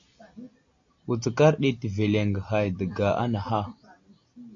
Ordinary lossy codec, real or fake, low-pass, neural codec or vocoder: AAC, 48 kbps; real; 7.2 kHz; none